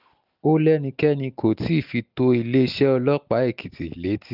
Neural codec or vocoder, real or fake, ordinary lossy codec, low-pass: none; real; none; 5.4 kHz